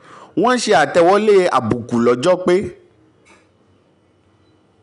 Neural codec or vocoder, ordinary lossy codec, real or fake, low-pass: none; none; real; 10.8 kHz